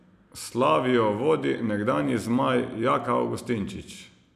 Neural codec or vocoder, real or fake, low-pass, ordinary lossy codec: none; real; 14.4 kHz; none